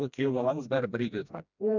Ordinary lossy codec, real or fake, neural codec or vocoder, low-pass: none; fake; codec, 16 kHz, 1 kbps, FreqCodec, smaller model; 7.2 kHz